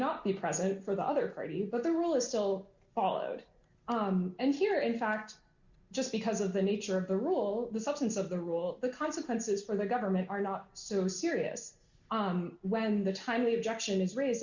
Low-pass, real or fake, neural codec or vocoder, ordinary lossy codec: 7.2 kHz; real; none; Opus, 64 kbps